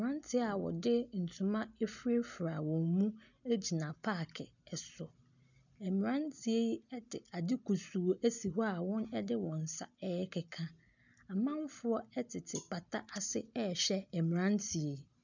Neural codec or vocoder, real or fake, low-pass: none; real; 7.2 kHz